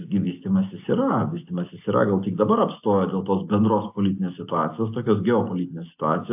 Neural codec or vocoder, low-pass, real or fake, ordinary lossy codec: none; 3.6 kHz; real; AAC, 32 kbps